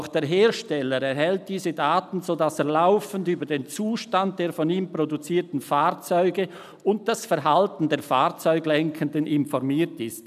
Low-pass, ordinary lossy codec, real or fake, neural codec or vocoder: 14.4 kHz; none; real; none